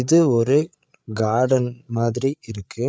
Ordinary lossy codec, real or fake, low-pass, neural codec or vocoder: none; fake; none; codec, 16 kHz, 8 kbps, FreqCodec, larger model